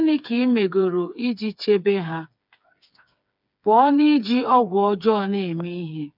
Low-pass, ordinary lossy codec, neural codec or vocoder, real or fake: 5.4 kHz; none; codec, 16 kHz, 4 kbps, FreqCodec, smaller model; fake